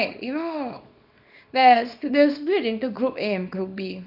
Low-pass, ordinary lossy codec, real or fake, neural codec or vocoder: 5.4 kHz; none; fake; codec, 24 kHz, 0.9 kbps, WavTokenizer, small release